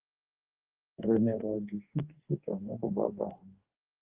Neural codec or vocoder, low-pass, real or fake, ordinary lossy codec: codec, 44.1 kHz, 2.6 kbps, DAC; 3.6 kHz; fake; Opus, 32 kbps